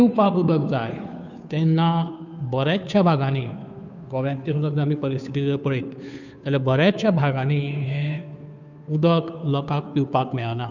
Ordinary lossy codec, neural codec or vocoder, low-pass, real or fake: none; codec, 16 kHz, 2 kbps, FunCodec, trained on Chinese and English, 25 frames a second; 7.2 kHz; fake